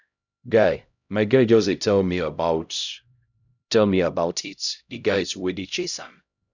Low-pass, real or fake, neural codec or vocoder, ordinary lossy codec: 7.2 kHz; fake; codec, 16 kHz, 0.5 kbps, X-Codec, HuBERT features, trained on LibriSpeech; none